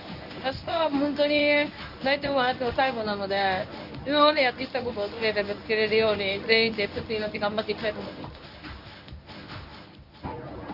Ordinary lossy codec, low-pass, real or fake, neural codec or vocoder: none; 5.4 kHz; fake; codec, 24 kHz, 0.9 kbps, WavTokenizer, medium speech release version 1